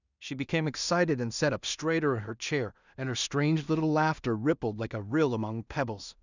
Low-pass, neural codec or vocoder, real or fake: 7.2 kHz; codec, 16 kHz in and 24 kHz out, 0.4 kbps, LongCat-Audio-Codec, two codebook decoder; fake